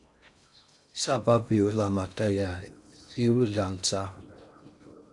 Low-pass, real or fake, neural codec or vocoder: 10.8 kHz; fake; codec, 16 kHz in and 24 kHz out, 0.6 kbps, FocalCodec, streaming, 4096 codes